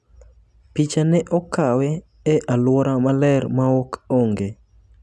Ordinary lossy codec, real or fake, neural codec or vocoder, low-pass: none; real; none; none